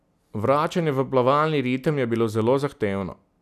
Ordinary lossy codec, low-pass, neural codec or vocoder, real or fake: none; 14.4 kHz; none; real